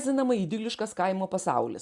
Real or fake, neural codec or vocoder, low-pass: real; none; 10.8 kHz